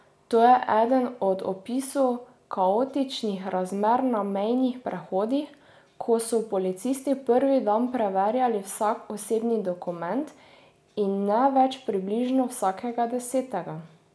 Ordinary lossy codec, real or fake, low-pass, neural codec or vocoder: none; real; none; none